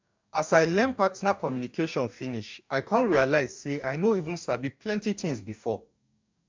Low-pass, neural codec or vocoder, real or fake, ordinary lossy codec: 7.2 kHz; codec, 44.1 kHz, 2.6 kbps, DAC; fake; MP3, 64 kbps